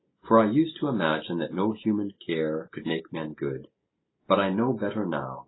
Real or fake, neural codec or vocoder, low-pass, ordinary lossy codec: real; none; 7.2 kHz; AAC, 16 kbps